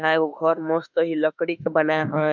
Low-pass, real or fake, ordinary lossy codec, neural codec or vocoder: 7.2 kHz; fake; none; autoencoder, 48 kHz, 32 numbers a frame, DAC-VAE, trained on Japanese speech